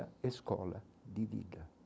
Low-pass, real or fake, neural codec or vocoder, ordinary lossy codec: none; real; none; none